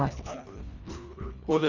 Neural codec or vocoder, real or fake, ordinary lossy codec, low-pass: codec, 24 kHz, 1.5 kbps, HILCodec; fake; Opus, 64 kbps; 7.2 kHz